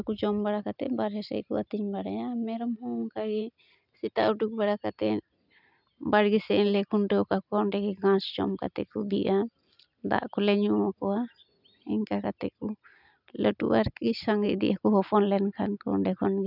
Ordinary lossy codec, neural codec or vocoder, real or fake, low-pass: none; none; real; 5.4 kHz